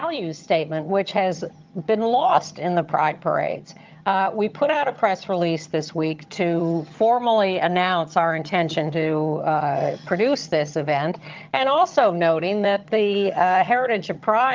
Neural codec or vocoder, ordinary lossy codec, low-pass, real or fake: vocoder, 22.05 kHz, 80 mel bands, HiFi-GAN; Opus, 32 kbps; 7.2 kHz; fake